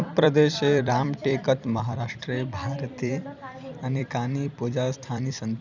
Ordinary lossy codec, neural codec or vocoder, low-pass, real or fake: none; vocoder, 44.1 kHz, 128 mel bands every 256 samples, BigVGAN v2; 7.2 kHz; fake